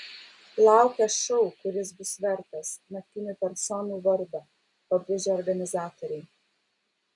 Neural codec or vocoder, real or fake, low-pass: none; real; 10.8 kHz